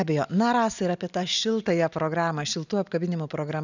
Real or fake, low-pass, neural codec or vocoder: real; 7.2 kHz; none